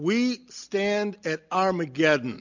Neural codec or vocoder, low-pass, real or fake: none; 7.2 kHz; real